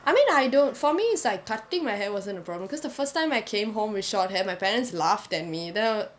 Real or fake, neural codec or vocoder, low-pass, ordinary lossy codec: real; none; none; none